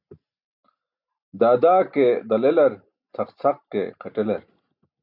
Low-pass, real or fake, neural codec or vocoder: 5.4 kHz; real; none